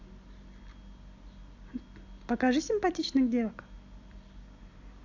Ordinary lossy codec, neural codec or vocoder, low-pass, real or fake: AAC, 48 kbps; none; 7.2 kHz; real